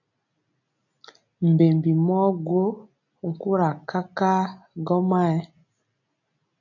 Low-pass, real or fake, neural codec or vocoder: 7.2 kHz; real; none